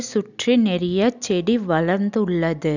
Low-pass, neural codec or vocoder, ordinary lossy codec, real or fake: 7.2 kHz; none; none; real